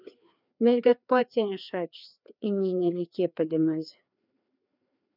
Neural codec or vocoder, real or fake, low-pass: codec, 16 kHz, 2 kbps, FreqCodec, larger model; fake; 5.4 kHz